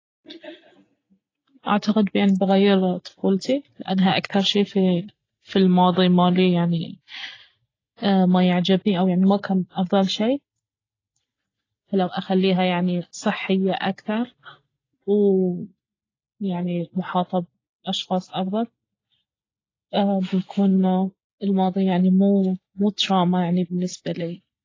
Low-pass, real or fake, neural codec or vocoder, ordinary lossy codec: 7.2 kHz; real; none; AAC, 32 kbps